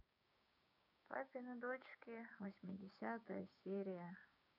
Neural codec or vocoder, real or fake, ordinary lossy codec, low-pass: codec, 16 kHz, 6 kbps, DAC; fake; none; 5.4 kHz